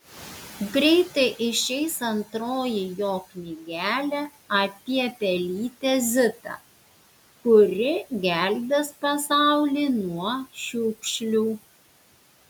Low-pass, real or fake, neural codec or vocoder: 19.8 kHz; real; none